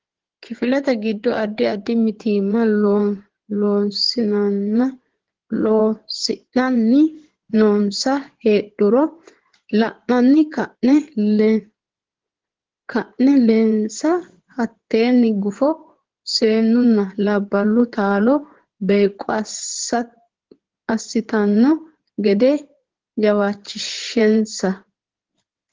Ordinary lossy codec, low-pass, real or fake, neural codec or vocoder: Opus, 16 kbps; 7.2 kHz; fake; codec, 16 kHz in and 24 kHz out, 2.2 kbps, FireRedTTS-2 codec